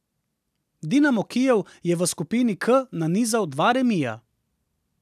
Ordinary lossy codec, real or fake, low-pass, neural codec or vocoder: none; real; 14.4 kHz; none